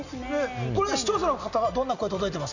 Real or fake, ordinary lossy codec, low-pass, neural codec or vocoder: real; none; 7.2 kHz; none